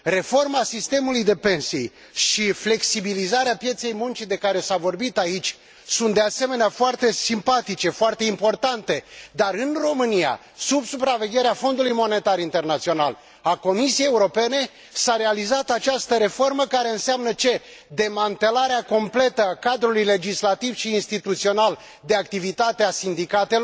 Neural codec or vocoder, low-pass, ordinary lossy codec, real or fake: none; none; none; real